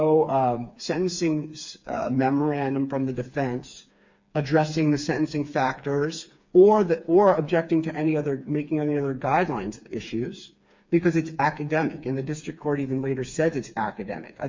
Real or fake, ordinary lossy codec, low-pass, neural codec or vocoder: fake; MP3, 64 kbps; 7.2 kHz; codec, 16 kHz, 4 kbps, FreqCodec, smaller model